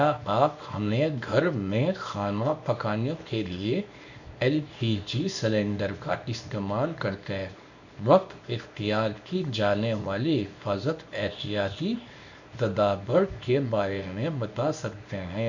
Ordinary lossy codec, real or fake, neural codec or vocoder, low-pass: none; fake; codec, 24 kHz, 0.9 kbps, WavTokenizer, small release; 7.2 kHz